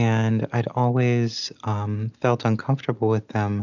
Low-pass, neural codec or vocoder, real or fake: 7.2 kHz; none; real